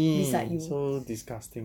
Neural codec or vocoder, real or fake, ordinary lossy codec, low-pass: none; real; none; 19.8 kHz